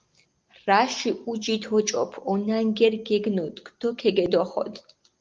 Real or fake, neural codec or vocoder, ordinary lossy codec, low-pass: real; none; Opus, 32 kbps; 7.2 kHz